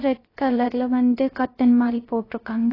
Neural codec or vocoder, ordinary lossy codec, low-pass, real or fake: codec, 16 kHz, 0.3 kbps, FocalCodec; AAC, 24 kbps; 5.4 kHz; fake